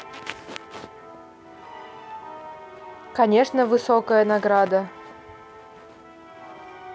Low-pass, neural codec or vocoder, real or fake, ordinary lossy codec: none; none; real; none